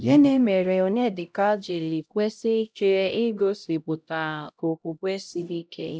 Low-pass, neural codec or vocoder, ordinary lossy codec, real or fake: none; codec, 16 kHz, 0.5 kbps, X-Codec, HuBERT features, trained on LibriSpeech; none; fake